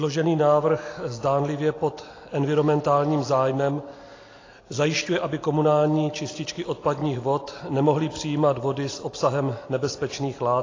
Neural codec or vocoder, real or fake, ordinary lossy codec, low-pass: none; real; AAC, 32 kbps; 7.2 kHz